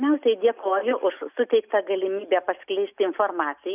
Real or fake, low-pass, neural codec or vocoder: real; 3.6 kHz; none